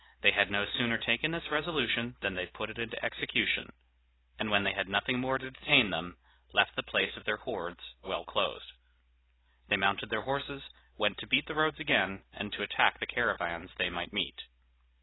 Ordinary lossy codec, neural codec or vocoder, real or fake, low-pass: AAC, 16 kbps; none; real; 7.2 kHz